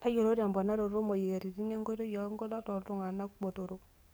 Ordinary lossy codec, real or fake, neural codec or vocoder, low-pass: none; fake; codec, 44.1 kHz, 7.8 kbps, DAC; none